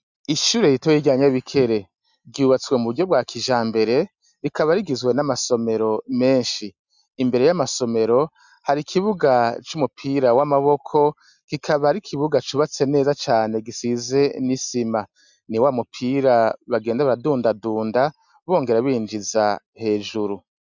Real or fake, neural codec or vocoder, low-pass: real; none; 7.2 kHz